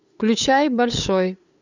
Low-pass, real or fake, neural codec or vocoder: 7.2 kHz; fake; codec, 16 kHz, 8 kbps, FunCodec, trained on LibriTTS, 25 frames a second